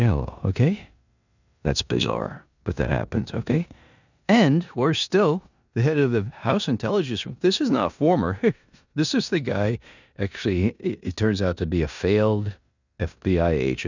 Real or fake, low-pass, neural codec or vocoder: fake; 7.2 kHz; codec, 16 kHz in and 24 kHz out, 0.9 kbps, LongCat-Audio-Codec, four codebook decoder